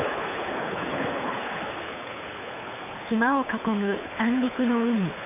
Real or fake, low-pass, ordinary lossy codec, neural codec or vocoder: fake; 3.6 kHz; none; codec, 24 kHz, 6 kbps, HILCodec